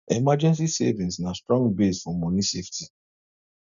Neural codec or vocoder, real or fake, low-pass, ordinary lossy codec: none; real; 7.2 kHz; none